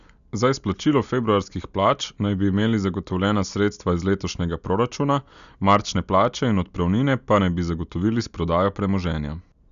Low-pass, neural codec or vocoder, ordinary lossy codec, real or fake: 7.2 kHz; none; none; real